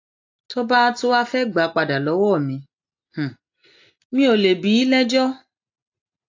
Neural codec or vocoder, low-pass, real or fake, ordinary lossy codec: none; 7.2 kHz; real; AAC, 48 kbps